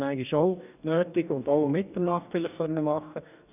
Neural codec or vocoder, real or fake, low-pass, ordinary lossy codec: codec, 44.1 kHz, 2.6 kbps, DAC; fake; 3.6 kHz; none